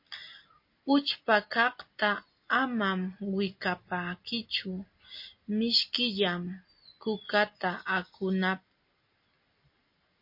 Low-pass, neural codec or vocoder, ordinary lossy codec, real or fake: 5.4 kHz; none; MP3, 32 kbps; real